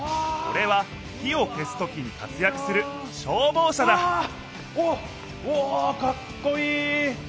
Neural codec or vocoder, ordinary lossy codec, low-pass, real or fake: none; none; none; real